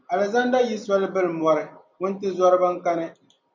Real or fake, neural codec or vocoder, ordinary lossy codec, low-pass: real; none; MP3, 64 kbps; 7.2 kHz